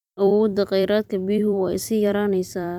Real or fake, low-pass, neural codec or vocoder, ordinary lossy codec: fake; 19.8 kHz; vocoder, 44.1 kHz, 128 mel bands every 256 samples, BigVGAN v2; none